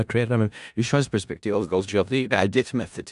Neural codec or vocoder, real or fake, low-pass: codec, 16 kHz in and 24 kHz out, 0.4 kbps, LongCat-Audio-Codec, four codebook decoder; fake; 10.8 kHz